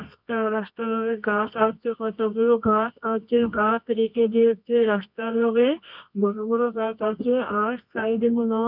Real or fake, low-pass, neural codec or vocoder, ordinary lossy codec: fake; 5.4 kHz; codec, 24 kHz, 0.9 kbps, WavTokenizer, medium music audio release; none